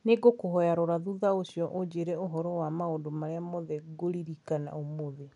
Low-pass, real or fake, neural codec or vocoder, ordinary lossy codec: 10.8 kHz; real; none; none